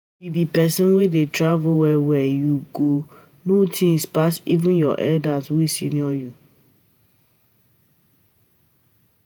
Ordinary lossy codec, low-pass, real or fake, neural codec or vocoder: none; none; fake; vocoder, 48 kHz, 128 mel bands, Vocos